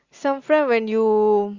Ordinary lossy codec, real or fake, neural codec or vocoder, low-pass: Opus, 64 kbps; real; none; 7.2 kHz